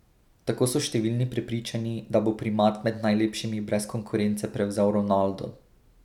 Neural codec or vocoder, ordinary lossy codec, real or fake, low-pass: none; none; real; 19.8 kHz